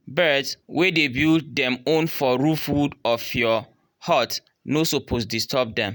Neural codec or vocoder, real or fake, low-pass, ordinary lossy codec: none; real; none; none